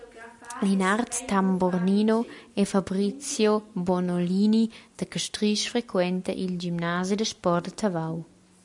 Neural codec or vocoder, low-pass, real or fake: none; 10.8 kHz; real